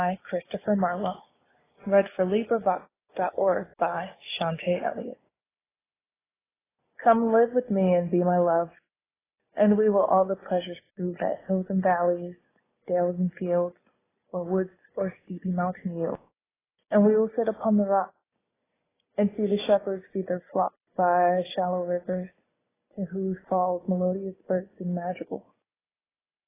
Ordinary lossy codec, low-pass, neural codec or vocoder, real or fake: AAC, 16 kbps; 3.6 kHz; none; real